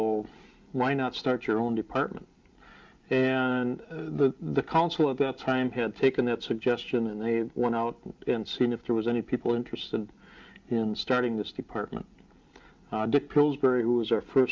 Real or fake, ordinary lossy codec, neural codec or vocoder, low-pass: real; Opus, 24 kbps; none; 7.2 kHz